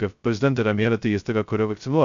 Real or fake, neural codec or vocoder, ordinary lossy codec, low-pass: fake; codec, 16 kHz, 0.2 kbps, FocalCodec; MP3, 64 kbps; 7.2 kHz